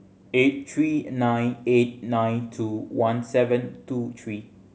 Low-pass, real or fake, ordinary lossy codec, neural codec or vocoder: none; real; none; none